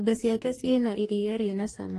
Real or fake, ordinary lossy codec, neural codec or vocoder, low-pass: fake; AAC, 48 kbps; codec, 44.1 kHz, 2.6 kbps, DAC; 14.4 kHz